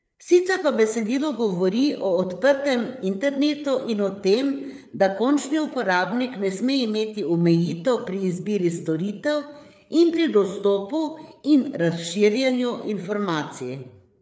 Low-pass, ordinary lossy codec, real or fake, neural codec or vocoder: none; none; fake; codec, 16 kHz, 4 kbps, FreqCodec, larger model